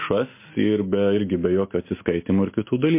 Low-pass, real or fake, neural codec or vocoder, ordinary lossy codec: 3.6 kHz; real; none; MP3, 24 kbps